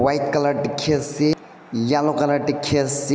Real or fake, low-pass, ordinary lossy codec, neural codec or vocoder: real; none; none; none